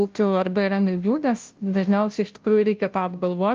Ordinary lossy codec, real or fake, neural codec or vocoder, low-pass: Opus, 24 kbps; fake; codec, 16 kHz, 0.5 kbps, FunCodec, trained on Chinese and English, 25 frames a second; 7.2 kHz